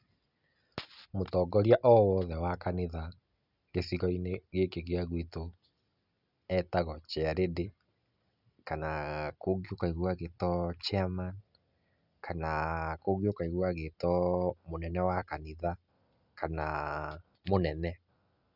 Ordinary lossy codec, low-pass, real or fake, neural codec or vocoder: none; 5.4 kHz; real; none